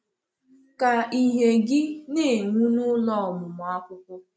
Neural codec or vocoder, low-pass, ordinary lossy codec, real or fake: none; none; none; real